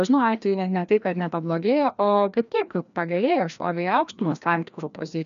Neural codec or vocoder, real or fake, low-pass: codec, 16 kHz, 1 kbps, FreqCodec, larger model; fake; 7.2 kHz